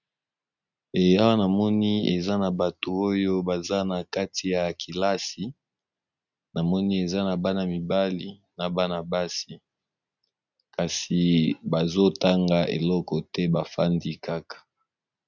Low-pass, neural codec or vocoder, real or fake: 7.2 kHz; none; real